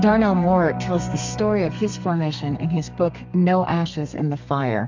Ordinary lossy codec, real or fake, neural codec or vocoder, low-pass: MP3, 64 kbps; fake; codec, 32 kHz, 1.9 kbps, SNAC; 7.2 kHz